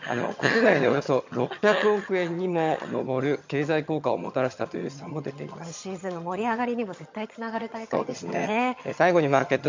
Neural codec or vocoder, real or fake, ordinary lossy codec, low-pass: vocoder, 22.05 kHz, 80 mel bands, HiFi-GAN; fake; MP3, 48 kbps; 7.2 kHz